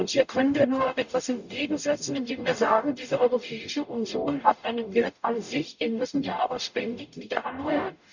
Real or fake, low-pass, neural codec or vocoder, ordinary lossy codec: fake; 7.2 kHz; codec, 44.1 kHz, 0.9 kbps, DAC; none